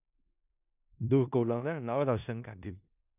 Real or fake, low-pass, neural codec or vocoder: fake; 3.6 kHz; codec, 16 kHz in and 24 kHz out, 0.4 kbps, LongCat-Audio-Codec, four codebook decoder